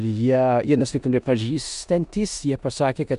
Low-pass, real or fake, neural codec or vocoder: 10.8 kHz; fake; codec, 16 kHz in and 24 kHz out, 0.9 kbps, LongCat-Audio-Codec, four codebook decoder